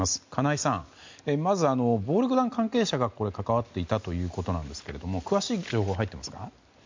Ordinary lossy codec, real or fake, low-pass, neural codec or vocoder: MP3, 64 kbps; real; 7.2 kHz; none